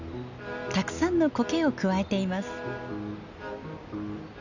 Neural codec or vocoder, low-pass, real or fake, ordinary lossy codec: none; 7.2 kHz; real; none